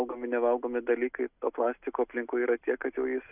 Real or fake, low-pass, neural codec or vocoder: real; 3.6 kHz; none